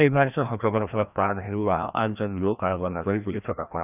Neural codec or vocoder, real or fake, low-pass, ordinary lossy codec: codec, 16 kHz, 1 kbps, FreqCodec, larger model; fake; 3.6 kHz; none